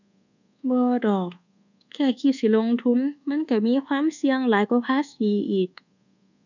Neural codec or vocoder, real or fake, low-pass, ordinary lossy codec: codec, 24 kHz, 1.2 kbps, DualCodec; fake; 7.2 kHz; none